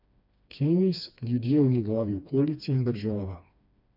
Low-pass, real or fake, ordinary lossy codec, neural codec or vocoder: 5.4 kHz; fake; none; codec, 16 kHz, 2 kbps, FreqCodec, smaller model